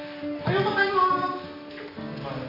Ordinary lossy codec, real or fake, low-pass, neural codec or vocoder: none; real; 5.4 kHz; none